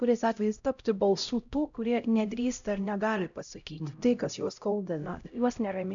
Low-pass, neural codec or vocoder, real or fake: 7.2 kHz; codec, 16 kHz, 0.5 kbps, X-Codec, HuBERT features, trained on LibriSpeech; fake